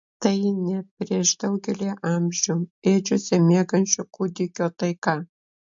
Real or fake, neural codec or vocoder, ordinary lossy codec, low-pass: real; none; MP3, 48 kbps; 7.2 kHz